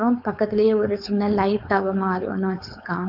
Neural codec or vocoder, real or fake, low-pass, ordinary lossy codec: codec, 16 kHz, 4.8 kbps, FACodec; fake; 5.4 kHz; AAC, 48 kbps